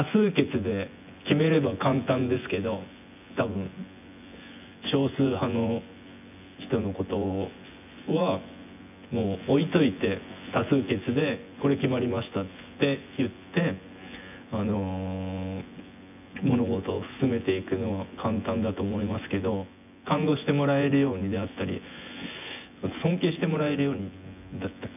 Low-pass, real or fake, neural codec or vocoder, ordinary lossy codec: 3.6 kHz; fake; vocoder, 24 kHz, 100 mel bands, Vocos; none